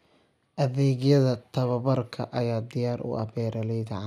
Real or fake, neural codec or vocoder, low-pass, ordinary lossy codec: real; none; 14.4 kHz; none